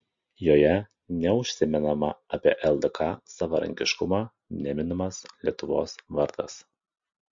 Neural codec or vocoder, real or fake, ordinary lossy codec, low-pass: none; real; MP3, 48 kbps; 7.2 kHz